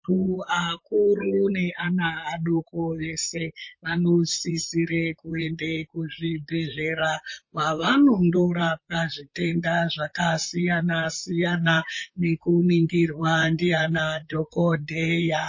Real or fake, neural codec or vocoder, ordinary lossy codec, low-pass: fake; vocoder, 44.1 kHz, 128 mel bands, Pupu-Vocoder; MP3, 32 kbps; 7.2 kHz